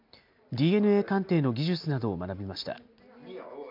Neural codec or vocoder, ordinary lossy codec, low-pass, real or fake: none; MP3, 48 kbps; 5.4 kHz; real